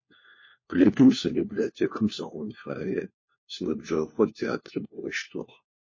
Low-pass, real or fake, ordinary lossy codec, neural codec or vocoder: 7.2 kHz; fake; MP3, 32 kbps; codec, 16 kHz, 1 kbps, FunCodec, trained on LibriTTS, 50 frames a second